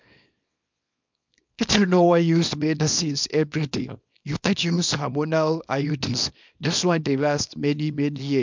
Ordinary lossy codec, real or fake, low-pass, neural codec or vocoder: MP3, 64 kbps; fake; 7.2 kHz; codec, 24 kHz, 0.9 kbps, WavTokenizer, small release